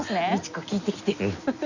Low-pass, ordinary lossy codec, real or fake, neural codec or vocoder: 7.2 kHz; none; real; none